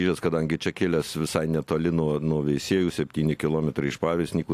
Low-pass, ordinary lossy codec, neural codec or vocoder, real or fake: 14.4 kHz; AAC, 64 kbps; none; real